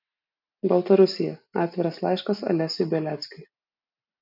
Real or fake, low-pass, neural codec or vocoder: real; 5.4 kHz; none